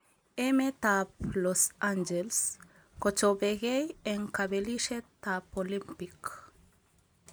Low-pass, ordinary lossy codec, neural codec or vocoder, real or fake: none; none; none; real